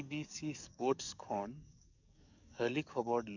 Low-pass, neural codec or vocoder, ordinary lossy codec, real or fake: 7.2 kHz; codec, 44.1 kHz, 7.8 kbps, DAC; none; fake